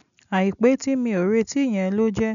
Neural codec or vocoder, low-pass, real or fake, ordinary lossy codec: none; 7.2 kHz; real; none